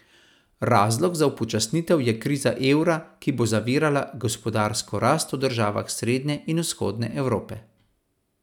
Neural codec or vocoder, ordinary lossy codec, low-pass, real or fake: none; none; 19.8 kHz; real